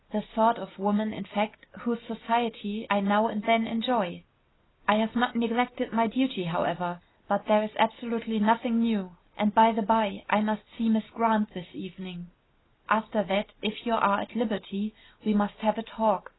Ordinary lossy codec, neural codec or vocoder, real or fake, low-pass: AAC, 16 kbps; none; real; 7.2 kHz